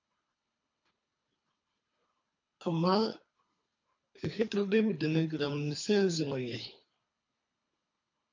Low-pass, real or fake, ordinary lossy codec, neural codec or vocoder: 7.2 kHz; fake; MP3, 48 kbps; codec, 24 kHz, 3 kbps, HILCodec